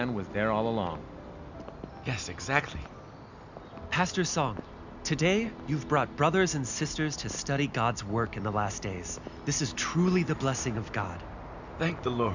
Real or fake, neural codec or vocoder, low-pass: real; none; 7.2 kHz